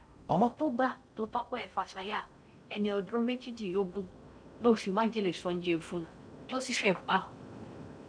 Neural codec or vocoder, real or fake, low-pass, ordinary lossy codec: codec, 16 kHz in and 24 kHz out, 0.6 kbps, FocalCodec, streaming, 4096 codes; fake; 9.9 kHz; none